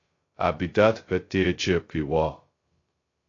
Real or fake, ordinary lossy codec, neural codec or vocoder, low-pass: fake; AAC, 32 kbps; codec, 16 kHz, 0.2 kbps, FocalCodec; 7.2 kHz